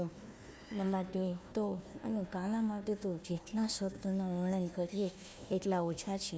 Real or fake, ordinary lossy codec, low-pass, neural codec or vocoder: fake; none; none; codec, 16 kHz, 1 kbps, FunCodec, trained on Chinese and English, 50 frames a second